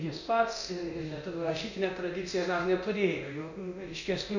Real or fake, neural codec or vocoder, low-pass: fake; codec, 24 kHz, 0.9 kbps, DualCodec; 7.2 kHz